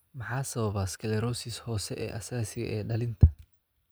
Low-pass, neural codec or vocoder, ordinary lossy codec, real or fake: none; none; none; real